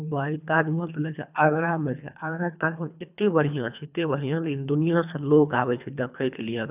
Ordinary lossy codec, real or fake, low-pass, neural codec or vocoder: none; fake; 3.6 kHz; codec, 24 kHz, 3 kbps, HILCodec